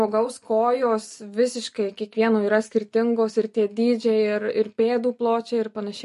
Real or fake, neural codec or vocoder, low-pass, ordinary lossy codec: real; none; 14.4 kHz; MP3, 48 kbps